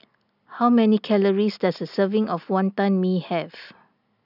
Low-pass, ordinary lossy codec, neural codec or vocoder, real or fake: 5.4 kHz; none; none; real